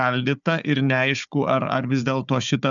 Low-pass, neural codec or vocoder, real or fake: 7.2 kHz; codec, 16 kHz, 4 kbps, FunCodec, trained on Chinese and English, 50 frames a second; fake